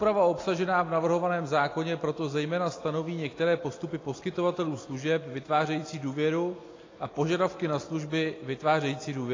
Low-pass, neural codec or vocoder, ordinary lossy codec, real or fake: 7.2 kHz; none; AAC, 32 kbps; real